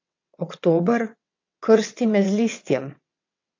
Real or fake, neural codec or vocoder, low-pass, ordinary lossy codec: fake; vocoder, 22.05 kHz, 80 mel bands, WaveNeXt; 7.2 kHz; AAC, 48 kbps